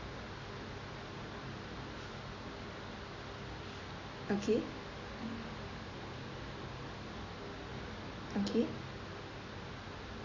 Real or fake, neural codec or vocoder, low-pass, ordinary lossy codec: real; none; 7.2 kHz; AAC, 32 kbps